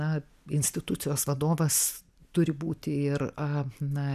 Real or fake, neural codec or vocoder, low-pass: real; none; 14.4 kHz